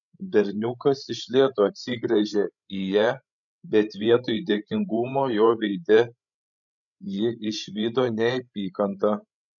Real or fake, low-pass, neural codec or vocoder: fake; 7.2 kHz; codec, 16 kHz, 16 kbps, FreqCodec, larger model